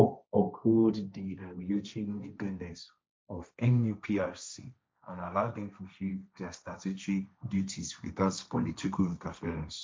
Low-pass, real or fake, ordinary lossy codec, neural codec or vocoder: none; fake; none; codec, 16 kHz, 1.1 kbps, Voila-Tokenizer